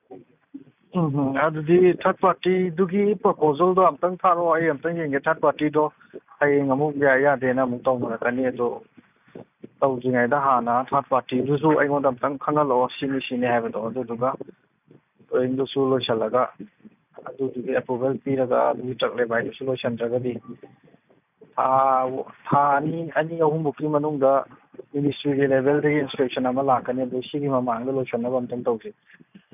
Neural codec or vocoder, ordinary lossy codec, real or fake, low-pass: none; none; real; 3.6 kHz